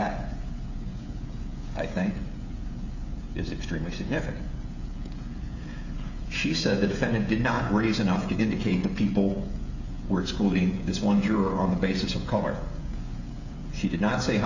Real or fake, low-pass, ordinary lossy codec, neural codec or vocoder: fake; 7.2 kHz; Opus, 64 kbps; codec, 16 kHz, 16 kbps, FreqCodec, smaller model